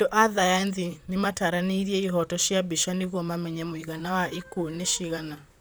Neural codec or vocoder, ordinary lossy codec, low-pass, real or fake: vocoder, 44.1 kHz, 128 mel bands, Pupu-Vocoder; none; none; fake